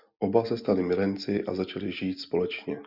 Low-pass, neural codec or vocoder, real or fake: 5.4 kHz; none; real